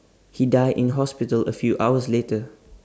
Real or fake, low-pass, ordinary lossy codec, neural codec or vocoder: real; none; none; none